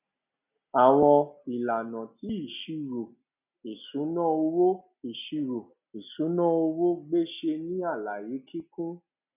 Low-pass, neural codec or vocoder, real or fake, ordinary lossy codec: 3.6 kHz; none; real; none